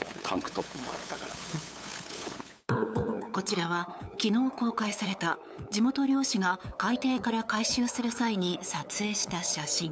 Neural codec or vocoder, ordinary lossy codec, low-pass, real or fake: codec, 16 kHz, 8 kbps, FunCodec, trained on LibriTTS, 25 frames a second; none; none; fake